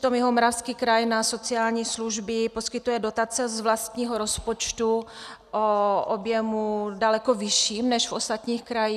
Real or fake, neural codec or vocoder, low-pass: real; none; 14.4 kHz